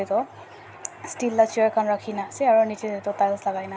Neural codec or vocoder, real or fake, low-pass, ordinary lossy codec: none; real; none; none